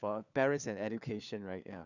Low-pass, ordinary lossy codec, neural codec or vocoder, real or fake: 7.2 kHz; none; codec, 16 kHz, 4 kbps, FunCodec, trained on LibriTTS, 50 frames a second; fake